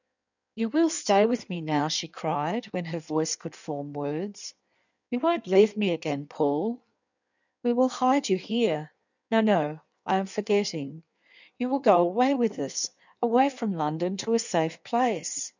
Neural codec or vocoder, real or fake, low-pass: codec, 16 kHz in and 24 kHz out, 1.1 kbps, FireRedTTS-2 codec; fake; 7.2 kHz